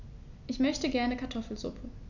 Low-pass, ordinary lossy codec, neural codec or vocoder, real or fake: 7.2 kHz; none; none; real